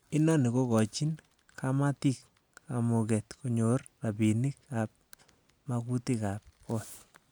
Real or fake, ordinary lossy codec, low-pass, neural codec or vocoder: real; none; none; none